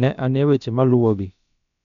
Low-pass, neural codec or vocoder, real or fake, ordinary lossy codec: 7.2 kHz; codec, 16 kHz, about 1 kbps, DyCAST, with the encoder's durations; fake; none